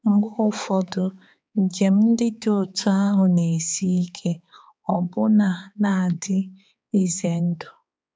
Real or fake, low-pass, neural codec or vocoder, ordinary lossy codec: fake; none; codec, 16 kHz, 4 kbps, X-Codec, HuBERT features, trained on balanced general audio; none